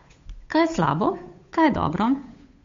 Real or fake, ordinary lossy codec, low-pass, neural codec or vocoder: fake; MP3, 48 kbps; 7.2 kHz; codec, 16 kHz, 8 kbps, FunCodec, trained on Chinese and English, 25 frames a second